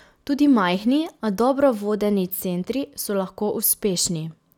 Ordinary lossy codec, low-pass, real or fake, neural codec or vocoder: none; 19.8 kHz; real; none